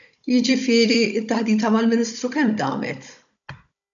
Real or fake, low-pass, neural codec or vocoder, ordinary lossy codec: fake; 7.2 kHz; codec, 16 kHz, 16 kbps, FunCodec, trained on Chinese and English, 50 frames a second; MP3, 96 kbps